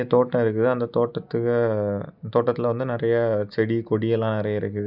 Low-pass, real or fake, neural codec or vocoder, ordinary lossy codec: 5.4 kHz; real; none; none